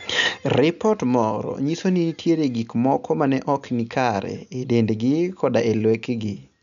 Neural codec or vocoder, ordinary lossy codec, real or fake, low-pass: none; none; real; 7.2 kHz